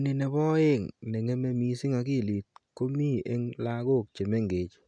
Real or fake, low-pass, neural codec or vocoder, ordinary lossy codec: real; 9.9 kHz; none; none